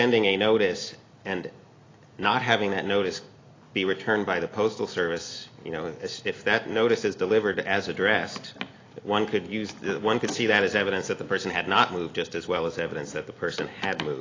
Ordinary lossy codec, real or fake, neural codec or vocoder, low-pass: AAC, 32 kbps; real; none; 7.2 kHz